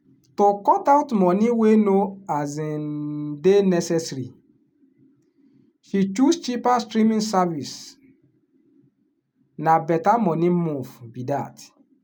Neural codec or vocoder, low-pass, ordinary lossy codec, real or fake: none; 19.8 kHz; none; real